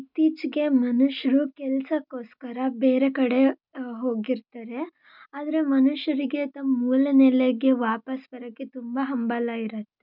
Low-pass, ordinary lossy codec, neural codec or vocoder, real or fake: 5.4 kHz; none; none; real